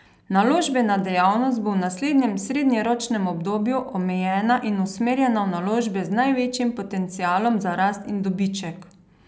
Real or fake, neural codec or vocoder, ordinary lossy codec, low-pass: real; none; none; none